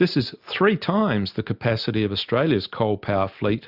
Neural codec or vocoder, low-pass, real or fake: none; 5.4 kHz; real